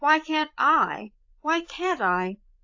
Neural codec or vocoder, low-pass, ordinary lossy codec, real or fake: codec, 16 kHz, 8 kbps, FreqCodec, larger model; 7.2 kHz; AAC, 48 kbps; fake